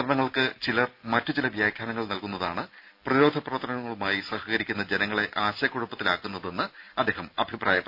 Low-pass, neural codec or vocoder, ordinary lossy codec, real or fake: 5.4 kHz; none; none; real